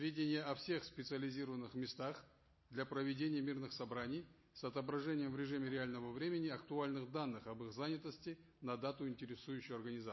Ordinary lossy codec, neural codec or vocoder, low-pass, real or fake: MP3, 24 kbps; none; 7.2 kHz; real